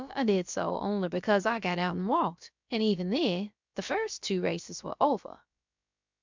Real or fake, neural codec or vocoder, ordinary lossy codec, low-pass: fake; codec, 16 kHz, about 1 kbps, DyCAST, with the encoder's durations; MP3, 64 kbps; 7.2 kHz